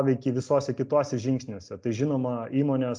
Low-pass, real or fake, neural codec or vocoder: 9.9 kHz; real; none